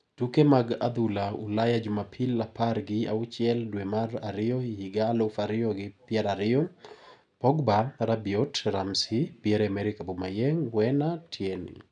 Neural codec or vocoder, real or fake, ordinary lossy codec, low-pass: none; real; none; 9.9 kHz